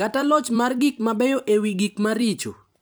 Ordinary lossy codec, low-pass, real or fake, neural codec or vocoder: none; none; fake; vocoder, 44.1 kHz, 128 mel bands every 256 samples, BigVGAN v2